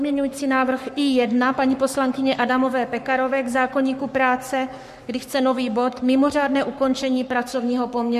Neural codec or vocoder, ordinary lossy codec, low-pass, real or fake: codec, 44.1 kHz, 7.8 kbps, Pupu-Codec; MP3, 64 kbps; 14.4 kHz; fake